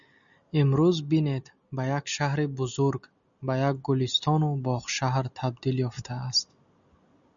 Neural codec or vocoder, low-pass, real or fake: none; 7.2 kHz; real